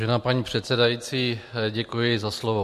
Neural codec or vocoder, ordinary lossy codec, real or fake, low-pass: none; MP3, 64 kbps; real; 14.4 kHz